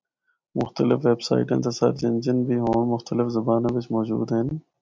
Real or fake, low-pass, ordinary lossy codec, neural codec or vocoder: real; 7.2 kHz; MP3, 64 kbps; none